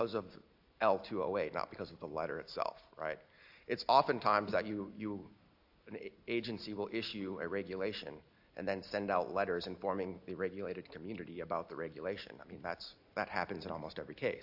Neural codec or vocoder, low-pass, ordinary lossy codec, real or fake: none; 5.4 kHz; AAC, 48 kbps; real